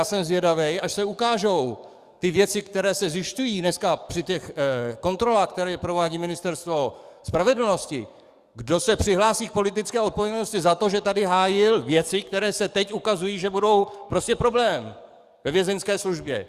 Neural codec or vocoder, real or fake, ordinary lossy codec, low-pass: codec, 44.1 kHz, 7.8 kbps, DAC; fake; Opus, 64 kbps; 14.4 kHz